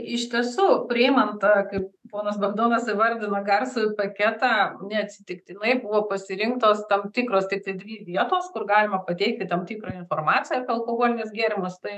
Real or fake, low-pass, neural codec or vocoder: fake; 14.4 kHz; autoencoder, 48 kHz, 128 numbers a frame, DAC-VAE, trained on Japanese speech